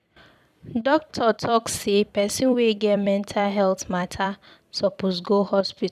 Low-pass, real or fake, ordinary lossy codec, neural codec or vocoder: 14.4 kHz; fake; none; vocoder, 44.1 kHz, 128 mel bands every 256 samples, BigVGAN v2